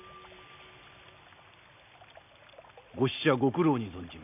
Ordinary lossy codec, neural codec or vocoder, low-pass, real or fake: none; none; 3.6 kHz; real